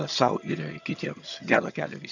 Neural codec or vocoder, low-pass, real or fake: vocoder, 22.05 kHz, 80 mel bands, HiFi-GAN; 7.2 kHz; fake